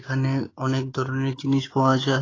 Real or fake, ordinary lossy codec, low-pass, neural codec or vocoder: fake; AAC, 32 kbps; 7.2 kHz; codec, 16 kHz, 6 kbps, DAC